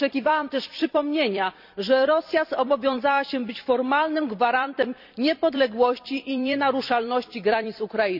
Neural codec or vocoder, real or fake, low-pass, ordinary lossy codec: vocoder, 44.1 kHz, 128 mel bands every 256 samples, BigVGAN v2; fake; 5.4 kHz; none